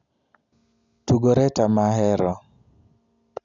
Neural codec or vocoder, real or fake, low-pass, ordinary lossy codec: none; real; 7.2 kHz; none